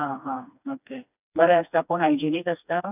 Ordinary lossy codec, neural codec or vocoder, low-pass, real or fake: none; codec, 16 kHz, 2 kbps, FreqCodec, smaller model; 3.6 kHz; fake